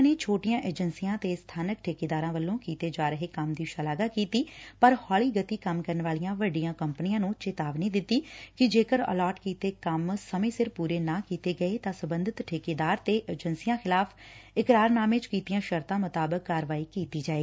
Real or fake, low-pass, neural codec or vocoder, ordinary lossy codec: real; none; none; none